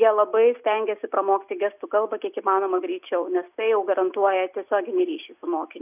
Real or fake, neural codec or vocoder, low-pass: real; none; 3.6 kHz